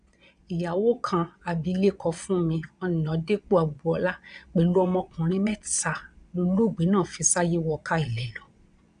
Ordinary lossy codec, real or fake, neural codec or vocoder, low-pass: none; fake; vocoder, 22.05 kHz, 80 mel bands, Vocos; 9.9 kHz